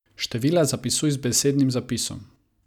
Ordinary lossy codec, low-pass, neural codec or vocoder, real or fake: none; 19.8 kHz; none; real